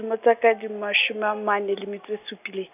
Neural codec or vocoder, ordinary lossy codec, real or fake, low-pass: none; none; real; 3.6 kHz